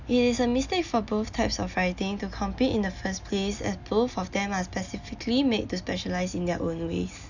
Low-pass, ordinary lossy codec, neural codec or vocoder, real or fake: 7.2 kHz; none; none; real